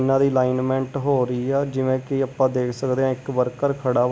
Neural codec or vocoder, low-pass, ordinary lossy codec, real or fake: none; none; none; real